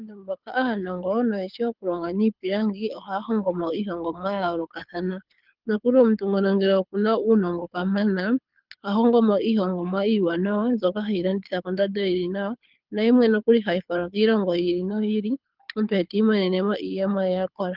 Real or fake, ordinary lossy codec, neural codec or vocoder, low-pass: fake; Opus, 24 kbps; codec, 24 kHz, 6 kbps, HILCodec; 5.4 kHz